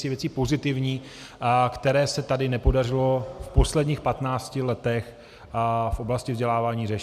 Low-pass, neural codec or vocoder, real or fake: 14.4 kHz; none; real